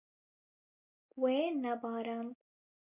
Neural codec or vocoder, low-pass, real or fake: none; 3.6 kHz; real